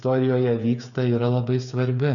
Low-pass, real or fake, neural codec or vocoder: 7.2 kHz; fake; codec, 16 kHz, 8 kbps, FreqCodec, smaller model